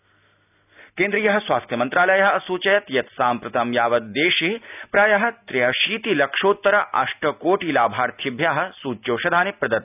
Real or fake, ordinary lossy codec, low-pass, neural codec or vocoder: real; none; 3.6 kHz; none